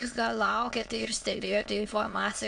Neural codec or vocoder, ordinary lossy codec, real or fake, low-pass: autoencoder, 22.05 kHz, a latent of 192 numbers a frame, VITS, trained on many speakers; AAC, 64 kbps; fake; 9.9 kHz